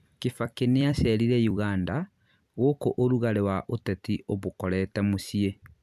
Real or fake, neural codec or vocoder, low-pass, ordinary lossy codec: fake; vocoder, 44.1 kHz, 128 mel bands every 256 samples, BigVGAN v2; 14.4 kHz; none